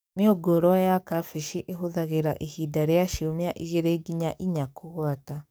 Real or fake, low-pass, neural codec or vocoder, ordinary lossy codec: fake; none; codec, 44.1 kHz, 7.8 kbps, DAC; none